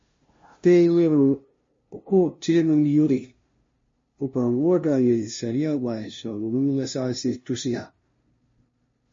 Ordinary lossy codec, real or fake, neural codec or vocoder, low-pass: MP3, 32 kbps; fake; codec, 16 kHz, 0.5 kbps, FunCodec, trained on LibriTTS, 25 frames a second; 7.2 kHz